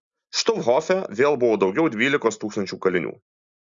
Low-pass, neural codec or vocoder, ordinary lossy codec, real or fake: 7.2 kHz; none; Opus, 64 kbps; real